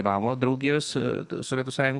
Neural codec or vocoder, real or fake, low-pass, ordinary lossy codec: codec, 44.1 kHz, 2.6 kbps, SNAC; fake; 10.8 kHz; Opus, 64 kbps